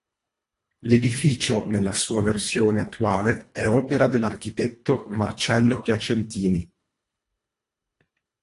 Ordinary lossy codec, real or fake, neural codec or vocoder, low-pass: AAC, 48 kbps; fake; codec, 24 kHz, 1.5 kbps, HILCodec; 10.8 kHz